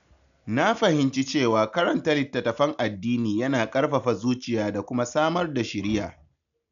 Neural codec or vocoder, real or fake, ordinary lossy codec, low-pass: none; real; none; 7.2 kHz